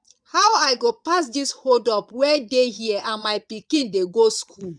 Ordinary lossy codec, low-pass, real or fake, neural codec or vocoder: none; 9.9 kHz; fake; vocoder, 22.05 kHz, 80 mel bands, Vocos